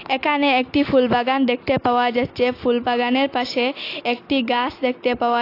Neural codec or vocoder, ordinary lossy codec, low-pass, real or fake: none; AAC, 32 kbps; 5.4 kHz; real